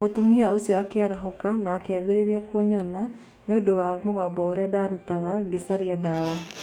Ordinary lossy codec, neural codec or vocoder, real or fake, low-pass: none; codec, 44.1 kHz, 2.6 kbps, DAC; fake; 19.8 kHz